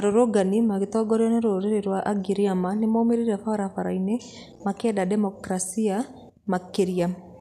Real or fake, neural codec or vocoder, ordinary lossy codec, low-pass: real; none; none; 14.4 kHz